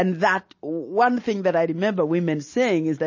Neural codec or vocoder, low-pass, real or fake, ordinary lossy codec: none; 7.2 kHz; real; MP3, 32 kbps